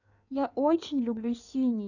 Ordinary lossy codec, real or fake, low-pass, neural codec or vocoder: none; fake; 7.2 kHz; codec, 16 kHz in and 24 kHz out, 1.1 kbps, FireRedTTS-2 codec